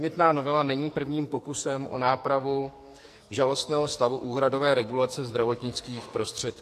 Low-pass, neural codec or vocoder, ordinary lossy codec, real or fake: 14.4 kHz; codec, 44.1 kHz, 2.6 kbps, SNAC; AAC, 64 kbps; fake